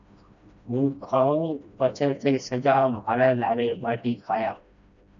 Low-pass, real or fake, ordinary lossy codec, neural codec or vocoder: 7.2 kHz; fake; MP3, 96 kbps; codec, 16 kHz, 1 kbps, FreqCodec, smaller model